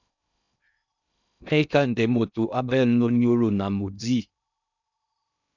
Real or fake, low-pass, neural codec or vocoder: fake; 7.2 kHz; codec, 16 kHz in and 24 kHz out, 0.6 kbps, FocalCodec, streaming, 2048 codes